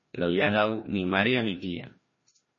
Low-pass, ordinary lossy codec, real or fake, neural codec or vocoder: 7.2 kHz; MP3, 32 kbps; fake; codec, 16 kHz, 1 kbps, FreqCodec, larger model